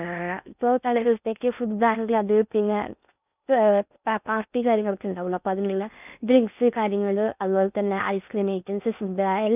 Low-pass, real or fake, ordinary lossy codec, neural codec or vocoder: 3.6 kHz; fake; none; codec, 16 kHz in and 24 kHz out, 0.6 kbps, FocalCodec, streaming, 4096 codes